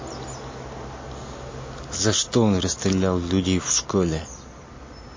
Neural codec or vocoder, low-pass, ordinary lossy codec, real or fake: none; 7.2 kHz; MP3, 32 kbps; real